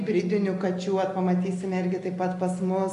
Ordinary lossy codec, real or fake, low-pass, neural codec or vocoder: AAC, 48 kbps; real; 10.8 kHz; none